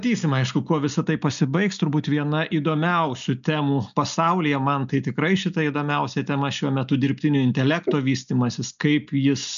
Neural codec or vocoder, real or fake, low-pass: none; real; 7.2 kHz